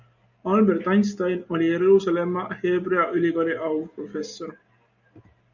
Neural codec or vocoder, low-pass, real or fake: none; 7.2 kHz; real